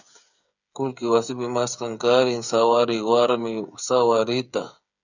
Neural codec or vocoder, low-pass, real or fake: codec, 16 kHz, 8 kbps, FreqCodec, smaller model; 7.2 kHz; fake